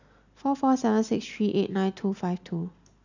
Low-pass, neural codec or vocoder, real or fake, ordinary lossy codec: 7.2 kHz; none; real; none